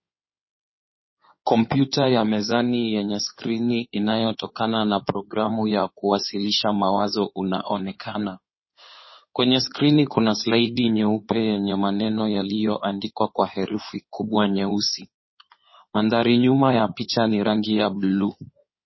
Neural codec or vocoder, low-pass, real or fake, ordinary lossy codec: codec, 16 kHz in and 24 kHz out, 2.2 kbps, FireRedTTS-2 codec; 7.2 kHz; fake; MP3, 24 kbps